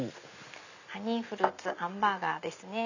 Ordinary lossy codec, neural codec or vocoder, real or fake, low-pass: none; none; real; 7.2 kHz